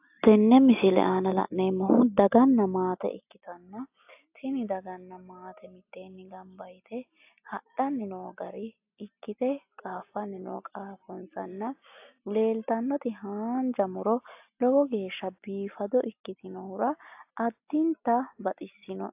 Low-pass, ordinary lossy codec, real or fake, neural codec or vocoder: 3.6 kHz; AAC, 32 kbps; real; none